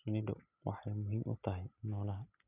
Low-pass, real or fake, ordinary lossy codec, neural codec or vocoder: 3.6 kHz; real; none; none